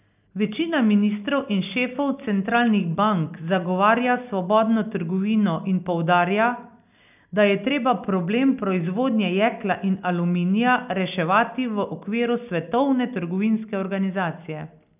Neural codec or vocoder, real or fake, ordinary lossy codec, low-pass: none; real; none; 3.6 kHz